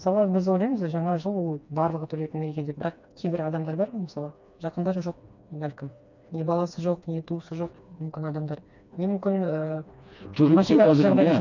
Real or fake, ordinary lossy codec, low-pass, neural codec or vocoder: fake; none; 7.2 kHz; codec, 16 kHz, 2 kbps, FreqCodec, smaller model